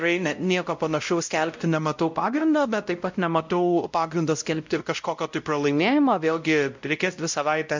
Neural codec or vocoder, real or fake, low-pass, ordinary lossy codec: codec, 16 kHz, 0.5 kbps, X-Codec, WavLM features, trained on Multilingual LibriSpeech; fake; 7.2 kHz; MP3, 64 kbps